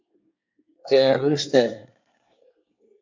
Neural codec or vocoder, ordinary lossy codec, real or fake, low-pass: codec, 24 kHz, 1 kbps, SNAC; MP3, 48 kbps; fake; 7.2 kHz